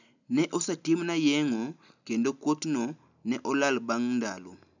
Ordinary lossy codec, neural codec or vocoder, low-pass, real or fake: none; none; 7.2 kHz; real